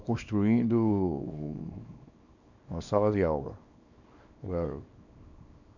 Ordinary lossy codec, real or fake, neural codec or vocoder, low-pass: none; fake; codec, 24 kHz, 0.9 kbps, WavTokenizer, small release; 7.2 kHz